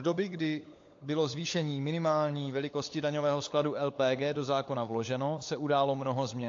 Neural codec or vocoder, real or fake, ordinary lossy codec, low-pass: codec, 16 kHz, 16 kbps, FunCodec, trained on LibriTTS, 50 frames a second; fake; AAC, 48 kbps; 7.2 kHz